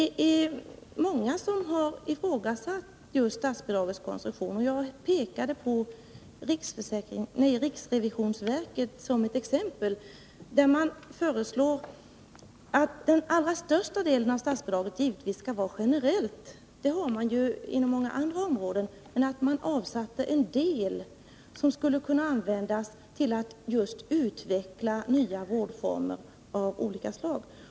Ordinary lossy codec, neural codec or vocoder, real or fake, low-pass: none; none; real; none